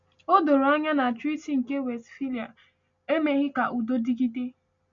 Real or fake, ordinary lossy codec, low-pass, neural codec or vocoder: real; MP3, 64 kbps; 7.2 kHz; none